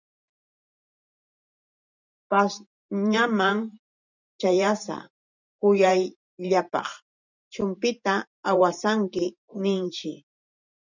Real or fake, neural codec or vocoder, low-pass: fake; vocoder, 44.1 kHz, 128 mel bands every 512 samples, BigVGAN v2; 7.2 kHz